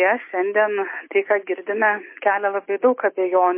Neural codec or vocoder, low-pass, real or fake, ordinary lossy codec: none; 3.6 kHz; real; MP3, 24 kbps